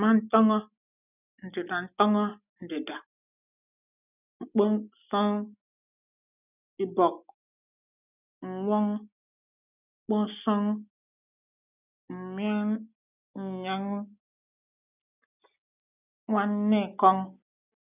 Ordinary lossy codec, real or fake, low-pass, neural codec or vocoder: none; real; 3.6 kHz; none